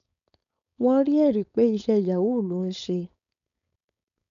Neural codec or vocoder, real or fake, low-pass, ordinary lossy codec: codec, 16 kHz, 4.8 kbps, FACodec; fake; 7.2 kHz; none